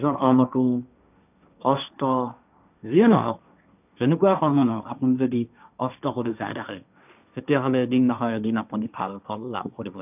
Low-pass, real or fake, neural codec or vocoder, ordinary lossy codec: 3.6 kHz; fake; codec, 16 kHz, 1.1 kbps, Voila-Tokenizer; none